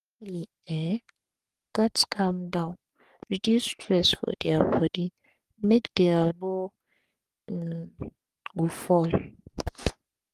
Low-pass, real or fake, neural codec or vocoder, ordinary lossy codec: 14.4 kHz; fake; codec, 44.1 kHz, 3.4 kbps, Pupu-Codec; Opus, 32 kbps